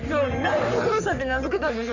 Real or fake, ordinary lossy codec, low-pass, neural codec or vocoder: fake; none; 7.2 kHz; codec, 44.1 kHz, 3.4 kbps, Pupu-Codec